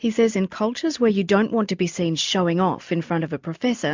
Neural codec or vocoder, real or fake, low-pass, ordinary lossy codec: none; real; 7.2 kHz; MP3, 64 kbps